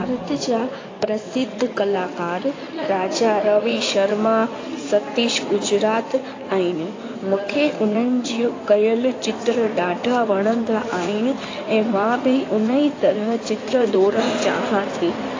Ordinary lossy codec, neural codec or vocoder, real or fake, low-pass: AAC, 32 kbps; codec, 16 kHz in and 24 kHz out, 2.2 kbps, FireRedTTS-2 codec; fake; 7.2 kHz